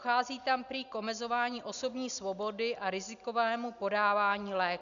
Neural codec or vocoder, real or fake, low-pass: none; real; 7.2 kHz